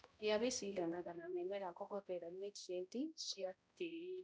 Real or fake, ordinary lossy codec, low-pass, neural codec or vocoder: fake; none; none; codec, 16 kHz, 0.5 kbps, X-Codec, HuBERT features, trained on balanced general audio